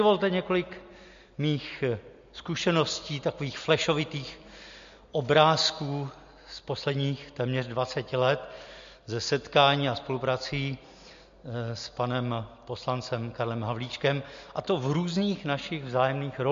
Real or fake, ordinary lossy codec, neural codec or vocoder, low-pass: real; MP3, 48 kbps; none; 7.2 kHz